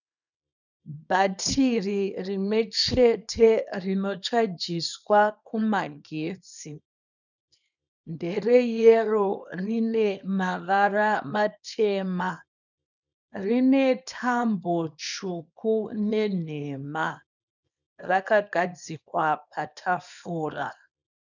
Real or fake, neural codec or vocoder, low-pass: fake; codec, 24 kHz, 0.9 kbps, WavTokenizer, small release; 7.2 kHz